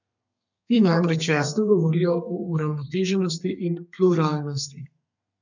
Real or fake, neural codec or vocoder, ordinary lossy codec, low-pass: fake; codec, 32 kHz, 1.9 kbps, SNAC; none; 7.2 kHz